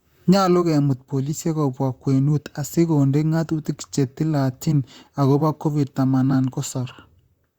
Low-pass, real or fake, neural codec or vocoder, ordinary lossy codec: 19.8 kHz; fake; vocoder, 44.1 kHz, 128 mel bands, Pupu-Vocoder; Opus, 64 kbps